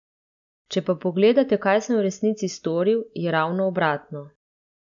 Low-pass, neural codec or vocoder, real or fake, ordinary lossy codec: 7.2 kHz; none; real; none